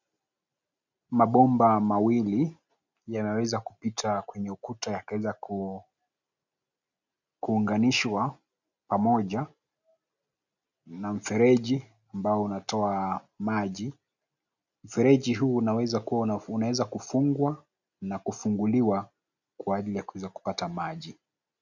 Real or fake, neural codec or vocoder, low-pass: real; none; 7.2 kHz